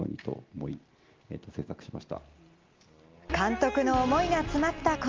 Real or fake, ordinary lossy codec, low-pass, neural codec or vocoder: real; Opus, 24 kbps; 7.2 kHz; none